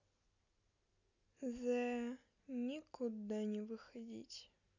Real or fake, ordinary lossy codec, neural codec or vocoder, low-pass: real; none; none; 7.2 kHz